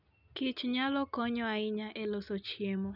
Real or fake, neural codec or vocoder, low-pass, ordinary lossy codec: real; none; 5.4 kHz; none